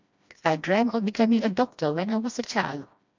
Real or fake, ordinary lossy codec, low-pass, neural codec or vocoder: fake; MP3, 64 kbps; 7.2 kHz; codec, 16 kHz, 1 kbps, FreqCodec, smaller model